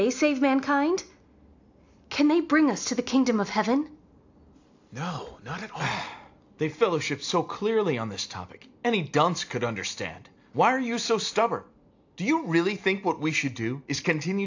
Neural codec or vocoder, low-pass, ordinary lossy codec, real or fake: none; 7.2 kHz; AAC, 48 kbps; real